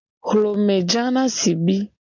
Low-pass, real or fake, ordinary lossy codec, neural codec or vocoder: 7.2 kHz; real; MP3, 64 kbps; none